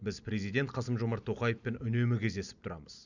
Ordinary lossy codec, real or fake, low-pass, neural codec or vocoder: none; real; 7.2 kHz; none